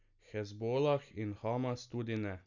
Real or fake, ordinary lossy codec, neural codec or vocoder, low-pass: real; none; none; 7.2 kHz